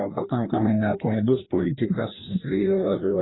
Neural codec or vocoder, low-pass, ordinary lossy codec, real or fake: codec, 16 kHz, 1 kbps, FreqCodec, larger model; 7.2 kHz; AAC, 16 kbps; fake